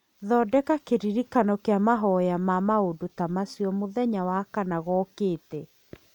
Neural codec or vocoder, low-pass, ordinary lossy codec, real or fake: none; 19.8 kHz; none; real